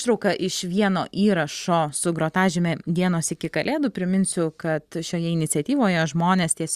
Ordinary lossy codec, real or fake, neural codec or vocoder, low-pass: Opus, 64 kbps; real; none; 14.4 kHz